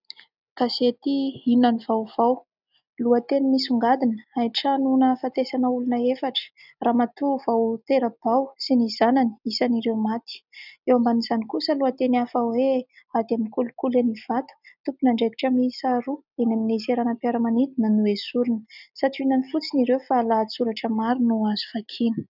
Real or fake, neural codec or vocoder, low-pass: real; none; 5.4 kHz